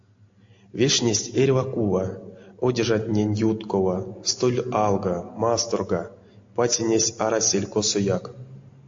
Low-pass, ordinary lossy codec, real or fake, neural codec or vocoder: 7.2 kHz; AAC, 48 kbps; real; none